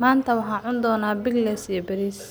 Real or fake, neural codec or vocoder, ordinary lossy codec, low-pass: real; none; none; none